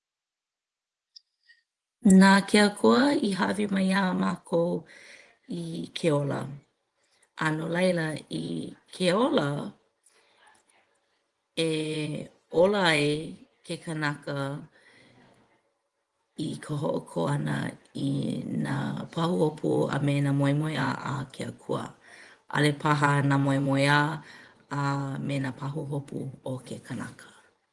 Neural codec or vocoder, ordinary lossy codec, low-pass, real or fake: vocoder, 24 kHz, 100 mel bands, Vocos; Opus, 24 kbps; 10.8 kHz; fake